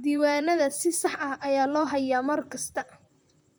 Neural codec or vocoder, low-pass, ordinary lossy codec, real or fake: vocoder, 44.1 kHz, 128 mel bands, Pupu-Vocoder; none; none; fake